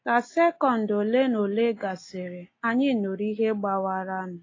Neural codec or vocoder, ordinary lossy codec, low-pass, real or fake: none; AAC, 32 kbps; 7.2 kHz; real